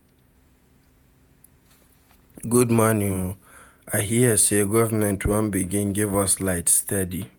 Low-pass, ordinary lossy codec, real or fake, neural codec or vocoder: none; none; fake; vocoder, 48 kHz, 128 mel bands, Vocos